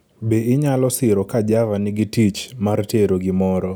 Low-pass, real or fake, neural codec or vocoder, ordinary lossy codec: none; real; none; none